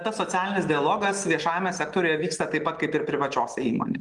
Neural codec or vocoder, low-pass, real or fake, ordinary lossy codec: none; 10.8 kHz; real; Opus, 24 kbps